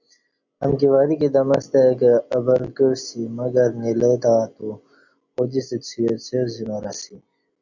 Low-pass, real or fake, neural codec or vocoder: 7.2 kHz; real; none